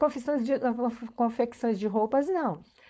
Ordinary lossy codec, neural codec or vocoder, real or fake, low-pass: none; codec, 16 kHz, 4.8 kbps, FACodec; fake; none